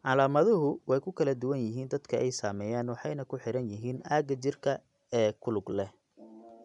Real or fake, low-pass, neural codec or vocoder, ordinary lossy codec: real; 10.8 kHz; none; none